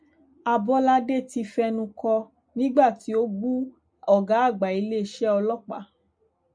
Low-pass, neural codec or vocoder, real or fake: 9.9 kHz; none; real